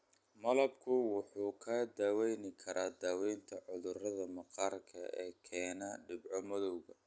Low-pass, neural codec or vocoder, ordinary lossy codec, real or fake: none; none; none; real